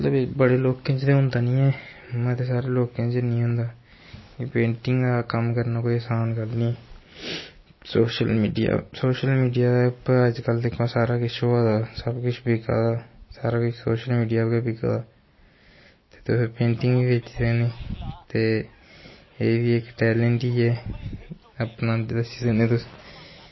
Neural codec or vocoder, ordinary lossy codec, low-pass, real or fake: none; MP3, 24 kbps; 7.2 kHz; real